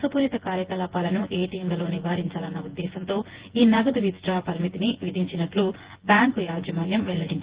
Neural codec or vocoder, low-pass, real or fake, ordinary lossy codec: vocoder, 24 kHz, 100 mel bands, Vocos; 3.6 kHz; fake; Opus, 16 kbps